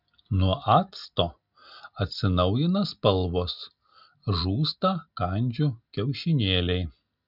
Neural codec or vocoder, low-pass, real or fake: none; 5.4 kHz; real